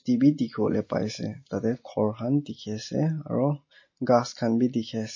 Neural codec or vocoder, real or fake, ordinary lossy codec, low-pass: none; real; MP3, 32 kbps; 7.2 kHz